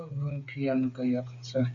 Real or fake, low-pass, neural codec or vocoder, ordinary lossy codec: fake; 7.2 kHz; codec, 16 kHz, 8 kbps, FreqCodec, smaller model; AAC, 48 kbps